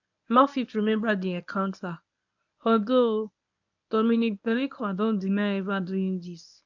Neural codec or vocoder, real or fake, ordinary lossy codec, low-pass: codec, 24 kHz, 0.9 kbps, WavTokenizer, medium speech release version 1; fake; none; 7.2 kHz